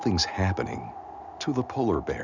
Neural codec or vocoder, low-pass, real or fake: none; 7.2 kHz; real